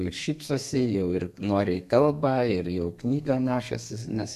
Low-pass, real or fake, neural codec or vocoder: 14.4 kHz; fake; codec, 32 kHz, 1.9 kbps, SNAC